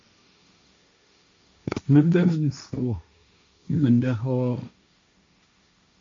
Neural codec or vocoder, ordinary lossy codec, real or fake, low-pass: codec, 16 kHz, 1.1 kbps, Voila-Tokenizer; MP3, 48 kbps; fake; 7.2 kHz